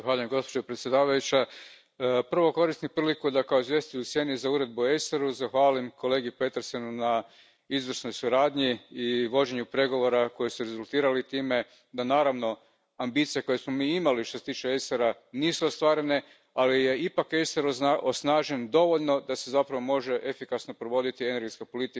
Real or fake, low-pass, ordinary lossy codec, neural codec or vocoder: real; none; none; none